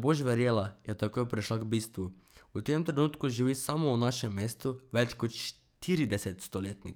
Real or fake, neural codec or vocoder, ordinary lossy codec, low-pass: fake; codec, 44.1 kHz, 7.8 kbps, DAC; none; none